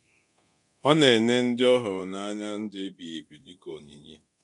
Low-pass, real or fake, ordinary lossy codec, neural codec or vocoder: 10.8 kHz; fake; none; codec, 24 kHz, 0.9 kbps, DualCodec